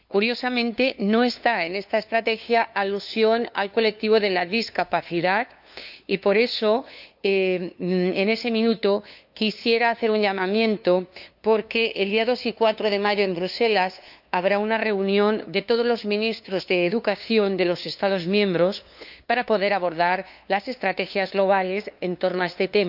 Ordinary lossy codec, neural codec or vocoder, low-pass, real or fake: none; codec, 16 kHz, 2 kbps, FunCodec, trained on LibriTTS, 25 frames a second; 5.4 kHz; fake